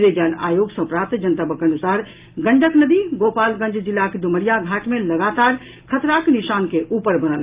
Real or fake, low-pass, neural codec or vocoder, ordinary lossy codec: real; 3.6 kHz; none; Opus, 24 kbps